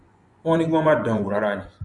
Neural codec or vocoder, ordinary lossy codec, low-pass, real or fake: vocoder, 24 kHz, 100 mel bands, Vocos; none; 10.8 kHz; fake